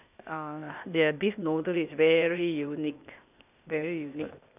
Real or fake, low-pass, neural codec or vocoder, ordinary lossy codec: fake; 3.6 kHz; codec, 16 kHz, 0.8 kbps, ZipCodec; none